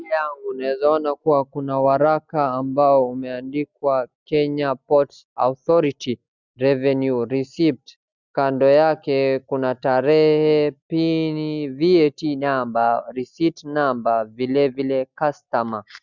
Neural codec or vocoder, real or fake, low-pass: none; real; 7.2 kHz